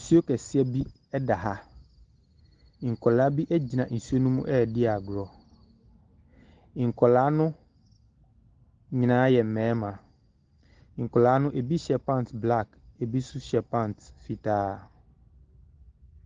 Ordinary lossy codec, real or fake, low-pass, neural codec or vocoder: Opus, 16 kbps; real; 7.2 kHz; none